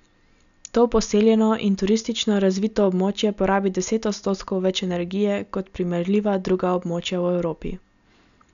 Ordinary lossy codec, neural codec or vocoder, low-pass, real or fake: none; none; 7.2 kHz; real